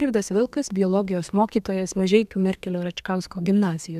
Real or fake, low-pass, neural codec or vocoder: fake; 14.4 kHz; codec, 32 kHz, 1.9 kbps, SNAC